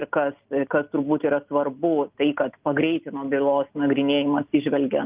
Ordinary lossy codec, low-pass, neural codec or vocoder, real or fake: Opus, 24 kbps; 3.6 kHz; none; real